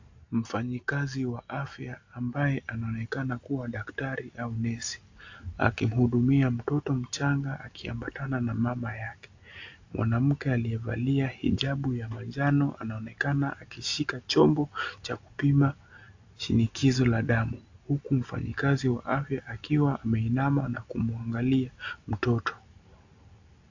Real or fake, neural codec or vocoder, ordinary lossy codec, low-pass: real; none; AAC, 48 kbps; 7.2 kHz